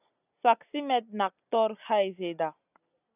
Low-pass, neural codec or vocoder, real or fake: 3.6 kHz; none; real